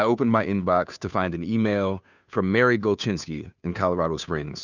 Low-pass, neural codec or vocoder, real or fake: 7.2 kHz; codec, 16 kHz, 2 kbps, FunCodec, trained on Chinese and English, 25 frames a second; fake